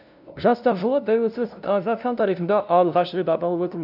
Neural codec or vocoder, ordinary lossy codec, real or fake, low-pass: codec, 16 kHz, 0.5 kbps, FunCodec, trained on LibriTTS, 25 frames a second; none; fake; 5.4 kHz